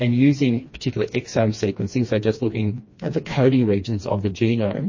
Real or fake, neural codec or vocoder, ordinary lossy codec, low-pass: fake; codec, 16 kHz, 2 kbps, FreqCodec, smaller model; MP3, 32 kbps; 7.2 kHz